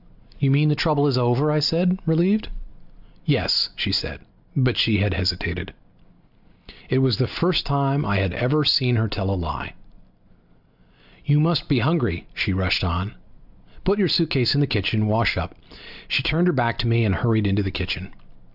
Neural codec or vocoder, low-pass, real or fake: none; 5.4 kHz; real